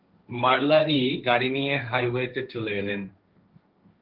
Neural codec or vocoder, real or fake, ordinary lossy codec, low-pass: codec, 16 kHz, 1.1 kbps, Voila-Tokenizer; fake; Opus, 32 kbps; 5.4 kHz